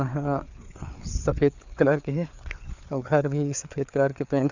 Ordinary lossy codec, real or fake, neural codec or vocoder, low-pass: none; fake; codec, 16 kHz, 4 kbps, FreqCodec, larger model; 7.2 kHz